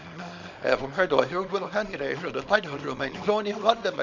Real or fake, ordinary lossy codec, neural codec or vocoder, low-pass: fake; none; codec, 24 kHz, 0.9 kbps, WavTokenizer, small release; 7.2 kHz